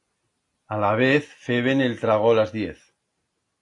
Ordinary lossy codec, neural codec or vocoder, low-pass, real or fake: AAC, 48 kbps; none; 10.8 kHz; real